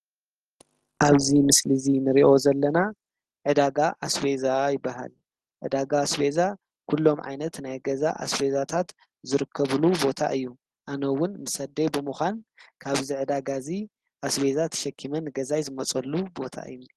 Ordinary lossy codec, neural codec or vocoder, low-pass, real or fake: Opus, 16 kbps; none; 10.8 kHz; real